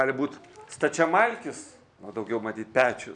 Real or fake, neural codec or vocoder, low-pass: real; none; 9.9 kHz